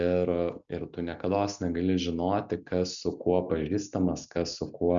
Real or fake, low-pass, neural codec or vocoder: real; 7.2 kHz; none